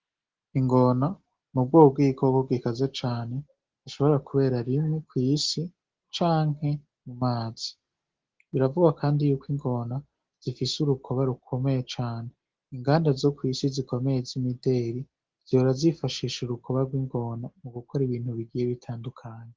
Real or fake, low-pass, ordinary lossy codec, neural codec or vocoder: real; 7.2 kHz; Opus, 16 kbps; none